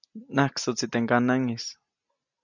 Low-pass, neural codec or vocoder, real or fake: 7.2 kHz; none; real